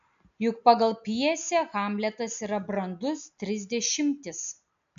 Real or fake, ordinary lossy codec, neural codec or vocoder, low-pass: real; AAC, 64 kbps; none; 7.2 kHz